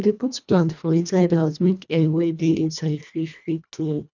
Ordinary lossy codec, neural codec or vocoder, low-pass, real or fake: none; codec, 24 kHz, 1.5 kbps, HILCodec; 7.2 kHz; fake